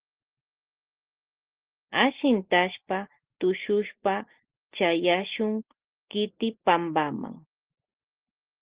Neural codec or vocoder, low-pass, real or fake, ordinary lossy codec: none; 3.6 kHz; real; Opus, 16 kbps